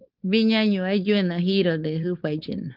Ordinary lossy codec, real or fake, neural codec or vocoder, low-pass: Opus, 24 kbps; fake; codec, 16 kHz, 4.8 kbps, FACodec; 5.4 kHz